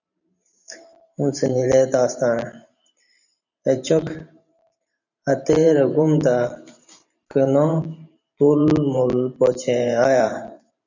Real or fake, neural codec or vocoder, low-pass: fake; vocoder, 44.1 kHz, 128 mel bands every 512 samples, BigVGAN v2; 7.2 kHz